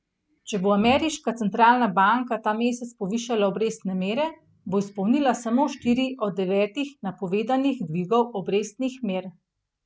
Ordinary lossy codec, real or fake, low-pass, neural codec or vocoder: none; real; none; none